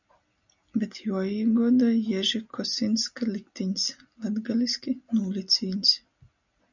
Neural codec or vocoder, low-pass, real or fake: none; 7.2 kHz; real